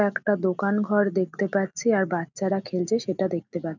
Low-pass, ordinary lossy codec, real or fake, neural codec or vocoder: 7.2 kHz; none; real; none